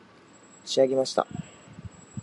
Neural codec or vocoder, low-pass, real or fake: none; 10.8 kHz; real